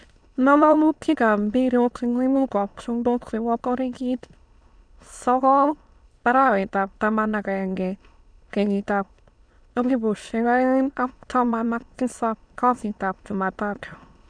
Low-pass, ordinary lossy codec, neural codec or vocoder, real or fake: 9.9 kHz; none; autoencoder, 22.05 kHz, a latent of 192 numbers a frame, VITS, trained on many speakers; fake